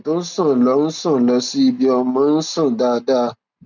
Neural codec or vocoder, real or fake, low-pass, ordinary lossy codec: none; real; 7.2 kHz; none